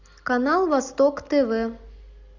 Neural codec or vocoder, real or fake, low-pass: none; real; 7.2 kHz